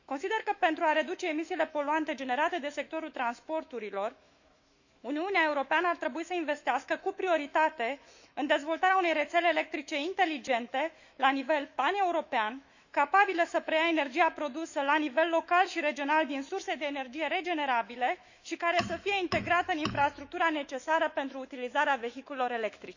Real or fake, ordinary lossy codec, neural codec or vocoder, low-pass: fake; Opus, 64 kbps; autoencoder, 48 kHz, 128 numbers a frame, DAC-VAE, trained on Japanese speech; 7.2 kHz